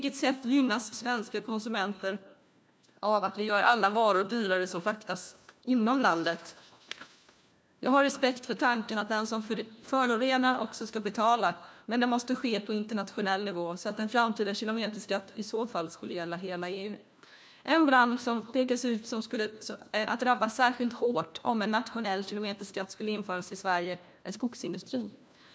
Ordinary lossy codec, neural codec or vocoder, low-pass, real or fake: none; codec, 16 kHz, 1 kbps, FunCodec, trained on LibriTTS, 50 frames a second; none; fake